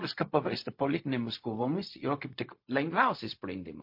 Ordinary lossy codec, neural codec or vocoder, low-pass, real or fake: MP3, 32 kbps; codec, 16 kHz, 0.4 kbps, LongCat-Audio-Codec; 5.4 kHz; fake